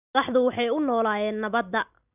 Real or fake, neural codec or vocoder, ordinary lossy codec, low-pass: real; none; none; 3.6 kHz